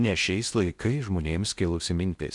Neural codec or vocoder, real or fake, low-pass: codec, 16 kHz in and 24 kHz out, 0.6 kbps, FocalCodec, streaming, 2048 codes; fake; 10.8 kHz